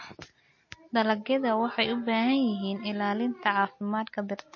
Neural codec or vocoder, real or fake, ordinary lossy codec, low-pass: none; real; MP3, 32 kbps; 7.2 kHz